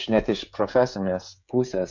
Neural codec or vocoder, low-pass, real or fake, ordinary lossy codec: codec, 16 kHz, 16 kbps, FreqCodec, smaller model; 7.2 kHz; fake; MP3, 64 kbps